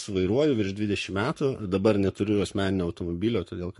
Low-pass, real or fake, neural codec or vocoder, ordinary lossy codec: 14.4 kHz; fake; codec, 44.1 kHz, 7.8 kbps, Pupu-Codec; MP3, 48 kbps